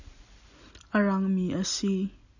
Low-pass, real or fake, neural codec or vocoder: 7.2 kHz; real; none